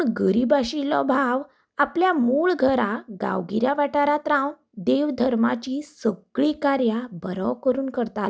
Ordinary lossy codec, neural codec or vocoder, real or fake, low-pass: none; none; real; none